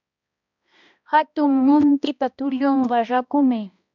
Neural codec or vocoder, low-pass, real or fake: codec, 16 kHz, 1 kbps, X-Codec, HuBERT features, trained on balanced general audio; 7.2 kHz; fake